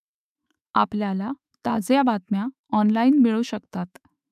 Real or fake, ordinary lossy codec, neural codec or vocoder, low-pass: fake; none; autoencoder, 48 kHz, 128 numbers a frame, DAC-VAE, trained on Japanese speech; 14.4 kHz